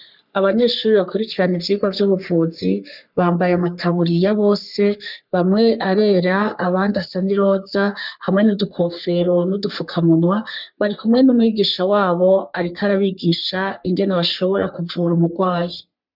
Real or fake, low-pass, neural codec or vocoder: fake; 5.4 kHz; codec, 44.1 kHz, 3.4 kbps, Pupu-Codec